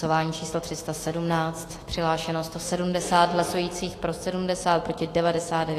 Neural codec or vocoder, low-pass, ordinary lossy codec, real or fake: autoencoder, 48 kHz, 128 numbers a frame, DAC-VAE, trained on Japanese speech; 14.4 kHz; AAC, 48 kbps; fake